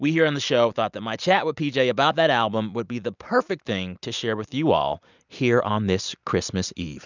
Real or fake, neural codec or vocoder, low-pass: real; none; 7.2 kHz